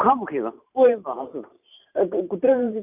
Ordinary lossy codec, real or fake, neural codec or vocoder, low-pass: none; fake; vocoder, 44.1 kHz, 128 mel bands every 512 samples, BigVGAN v2; 3.6 kHz